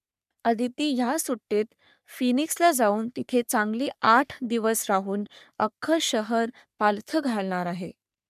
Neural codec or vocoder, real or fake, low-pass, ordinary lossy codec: codec, 44.1 kHz, 3.4 kbps, Pupu-Codec; fake; 14.4 kHz; none